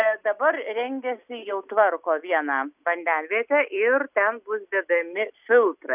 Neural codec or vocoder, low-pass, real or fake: none; 3.6 kHz; real